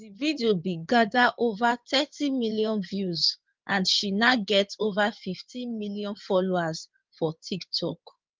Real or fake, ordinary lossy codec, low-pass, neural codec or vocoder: fake; Opus, 24 kbps; 7.2 kHz; codec, 16 kHz in and 24 kHz out, 2.2 kbps, FireRedTTS-2 codec